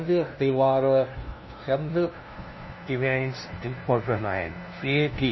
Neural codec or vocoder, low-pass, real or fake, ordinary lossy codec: codec, 16 kHz, 0.5 kbps, FunCodec, trained on LibriTTS, 25 frames a second; 7.2 kHz; fake; MP3, 24 kbps